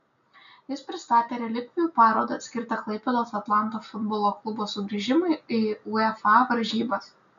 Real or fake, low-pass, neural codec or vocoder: real; 7.2 kHz; none